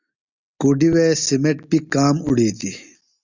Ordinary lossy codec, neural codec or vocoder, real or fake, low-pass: Opus, 64 kbps; none; real; 7.2 kHz